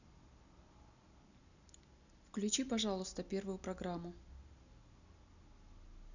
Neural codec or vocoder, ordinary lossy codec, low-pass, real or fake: none; none; 7.2 kHz; real